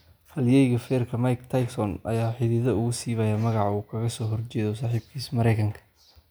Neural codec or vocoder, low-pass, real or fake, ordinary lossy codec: none; none; real; none